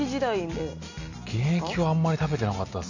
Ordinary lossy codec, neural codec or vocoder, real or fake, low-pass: none; none; real; 7.2 kHz